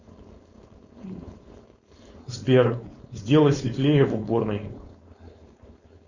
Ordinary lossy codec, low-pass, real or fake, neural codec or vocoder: Opus, 64 kbps; 7.2 kHz; fake; codec, 16 kHz, 4.8 kbps, FACodec